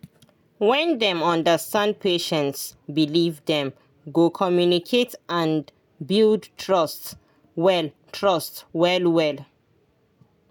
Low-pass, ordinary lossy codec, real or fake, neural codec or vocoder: 19.8 kHz; none; real; none